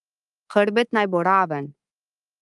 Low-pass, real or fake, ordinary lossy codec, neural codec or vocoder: 10.8 kHz; fake; Opus, 32 kbps; codec, 24 kHz, 3.1 kbps, DualCodec